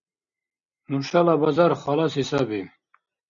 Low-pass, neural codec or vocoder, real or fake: 7.2 kHz; none; real